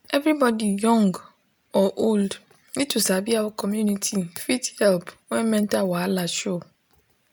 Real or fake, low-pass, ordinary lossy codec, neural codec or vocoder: real; none; none; none